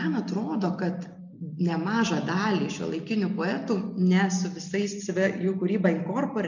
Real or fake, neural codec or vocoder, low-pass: real; none; 7.2 kHz